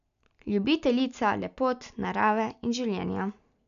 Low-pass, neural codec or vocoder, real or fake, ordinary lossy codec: 7.2 kHz; none; real; none